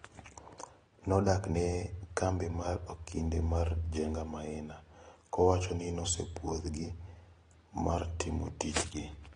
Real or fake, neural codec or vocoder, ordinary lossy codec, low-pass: real; none; AAC, 32 kbps; 9.9 kHz